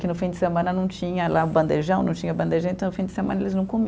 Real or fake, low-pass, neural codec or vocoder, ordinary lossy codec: real; none; none; none